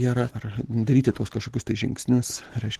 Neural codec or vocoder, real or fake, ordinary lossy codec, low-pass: none; real; Opus, 16 kbps; 14.4 kHz